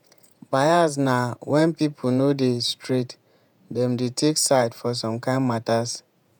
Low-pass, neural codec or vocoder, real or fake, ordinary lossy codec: none; vocoder, 48 kHz, 128 mel bands, Vocos; fake; none